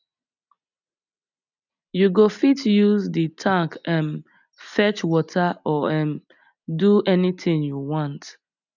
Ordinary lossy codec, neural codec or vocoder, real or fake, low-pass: none; none; real; 7.2 kHz